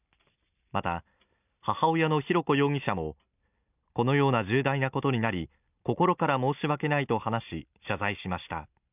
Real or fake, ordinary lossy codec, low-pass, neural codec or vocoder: real; none; 3.6 kHz; none